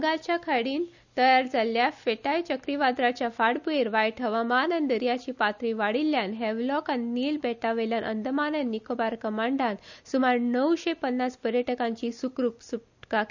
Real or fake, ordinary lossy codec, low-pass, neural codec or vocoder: real; none; 7.2 kHz; none